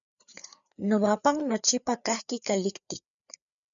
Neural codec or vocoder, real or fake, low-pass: codec, 16 kHz, 4 kbps, FreqCodec, larger model; fake; 7.2 kHz